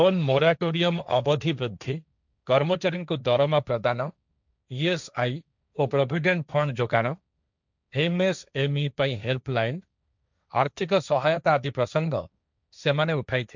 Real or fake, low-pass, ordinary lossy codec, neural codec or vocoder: fake; 7.2 kHz; none; codec, 16 kHz, 1.1 kbps, Voila-Tokenizer